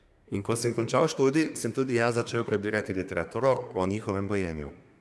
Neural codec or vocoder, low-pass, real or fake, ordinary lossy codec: codec, 24 kHz, 1 kbps, SNAC; none; fake; none